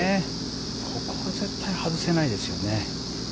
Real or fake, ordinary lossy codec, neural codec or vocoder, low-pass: real; none; none; none